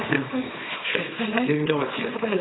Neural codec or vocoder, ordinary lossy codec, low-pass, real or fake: codec, 24 kHz, 0.9 kbps, WavTokenizer, small release; AAC, 16 kbps; 7.2 kHz; fake